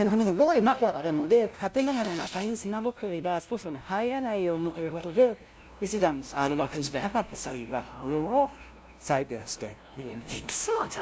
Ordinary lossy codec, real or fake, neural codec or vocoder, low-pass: none; fake; codec, 16 kHz, 0.5 kbps, FunCodec, trained on LibriTTS, 25 frames a second; none